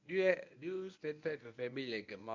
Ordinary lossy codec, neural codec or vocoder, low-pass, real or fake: MP3, 64 kbps; codec, 24 kHz, 0.9 kbps, WavTokenizer, medium speech release version 1; 7.2 kHz; fake